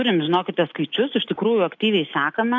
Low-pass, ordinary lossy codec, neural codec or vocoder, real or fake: 7.2 kHz; AAC, 48 kbps; none; real